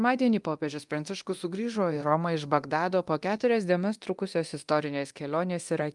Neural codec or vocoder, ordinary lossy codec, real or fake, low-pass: codec, 24 kHz, 0.9 kbps, DualCodec; Opus, 32 kbps; fake; 10.8 kHz